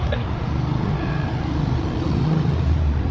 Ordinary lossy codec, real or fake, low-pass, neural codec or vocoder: none; fake; none; codec, 16 kHz, 8 kbps, FreqCodec, larger model